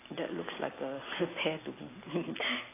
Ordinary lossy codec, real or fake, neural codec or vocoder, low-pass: AAC, 16 kbps; real; none; 3.6 kHz